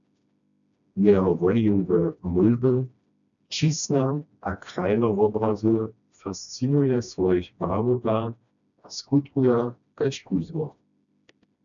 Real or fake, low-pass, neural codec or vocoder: fake; 7.2 kHz; codec, 16 kHz, 1 kbps, FreqCodec, smaller model